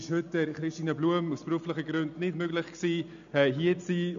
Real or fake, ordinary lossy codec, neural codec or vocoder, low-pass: real; none; none; 7.2 kHz